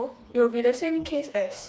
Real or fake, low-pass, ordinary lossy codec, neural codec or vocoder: fake; none; none; codec, 16 kHz, 2 kbps, FreqCodec, smaller model